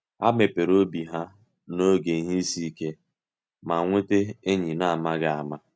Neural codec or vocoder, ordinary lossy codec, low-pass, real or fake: none; none; none; real